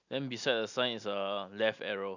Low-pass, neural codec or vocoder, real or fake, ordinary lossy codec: 7.2 kHz; none; real; none